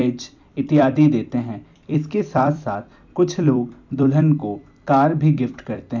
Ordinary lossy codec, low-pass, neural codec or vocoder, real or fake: none; 7.2 kHz; vocoder, 44.1 kHz, 128 mel bands every 256 samples, BigVGAN v2; fake